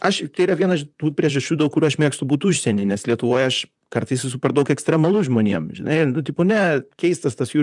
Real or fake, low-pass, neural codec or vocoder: fake; 10.8 kHz; vocoder, 44.1 kHz, 128 mel bands, Pupu-Vocoder